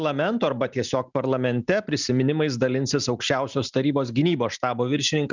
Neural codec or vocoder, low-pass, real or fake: none; 7.2 kHz; real